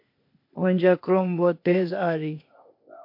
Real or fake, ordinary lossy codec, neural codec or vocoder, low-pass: fake; MP3, 32 kbps; codec, 16 kHz, 0.8 kbps, ZipCodec; 5.4 kHz